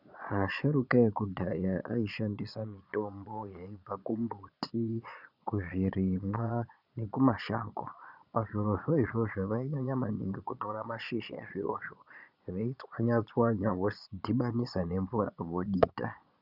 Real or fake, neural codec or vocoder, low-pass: fake; vocoder, 22.05 kHz, 80 mel bands, Vocos; 5.4 kHz